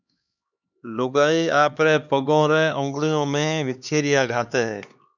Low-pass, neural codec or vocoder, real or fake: 7.2 kHz; codec, 16 kHz, 2 kbps, X-Codec, HuBERT features, trained on LibriSpeech; fake